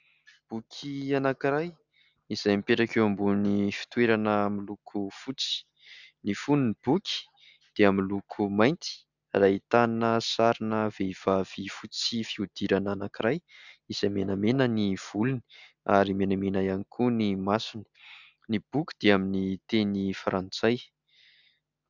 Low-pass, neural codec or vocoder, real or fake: 7.2 kHz; none; real